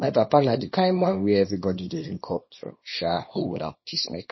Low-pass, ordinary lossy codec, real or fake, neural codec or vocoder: 7.2 kHz; MP3, 24 kbps; fake; codec, 24 kHz, 0.9 kbps, WavTokenizer, small release